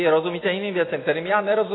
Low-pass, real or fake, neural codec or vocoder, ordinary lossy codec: 7.2 kHz; real; none; AAC, 16 kbps